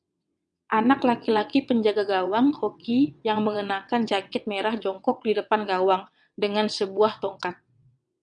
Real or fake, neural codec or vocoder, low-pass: fake; vocoder, 22.05 kHz, 80 mel bands, WaveNeXt; 9.9 kHz